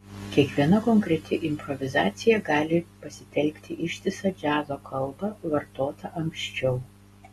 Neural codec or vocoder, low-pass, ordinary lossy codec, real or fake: none; 14.4 kHz; AAC, 32 kbps; real